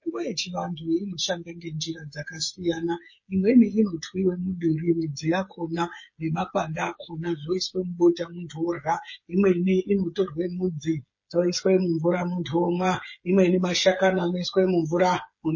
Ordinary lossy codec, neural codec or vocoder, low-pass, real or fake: MP3, 32 kbps; codec, 16 kHz, 8 kbps, FreqCodec, smaller model; 7.2 kHz; fake